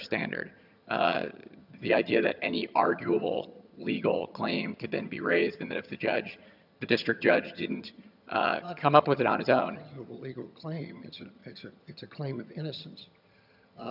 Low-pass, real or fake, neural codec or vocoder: 5.4 kHz; fake; vocoder, 22.05 kHz, 80 mel bands, HiFi-GAN